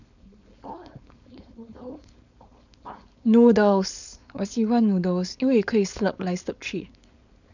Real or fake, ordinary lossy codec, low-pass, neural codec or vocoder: fake; none; 7.2 kHz; codec, 16 kHz, 4.8 kbps, FACodec